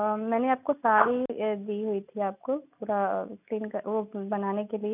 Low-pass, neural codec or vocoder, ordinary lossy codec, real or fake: 3.6 kHz; none; none; real